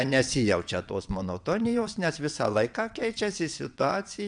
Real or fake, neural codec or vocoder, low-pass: fake; vocoder, 22.05 kHz, 80 mel bands, WaveNeXt; 9.9 kHz